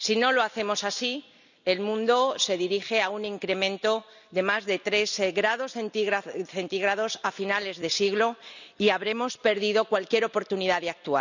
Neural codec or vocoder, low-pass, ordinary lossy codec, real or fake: none; 7.2 kHz; none; real